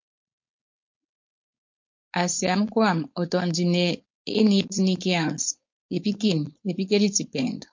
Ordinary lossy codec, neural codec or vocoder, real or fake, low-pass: MP3, 48 kbps; codec, 16 kHz, 4.8 kbps, FACodec; fake; 7.2 kHz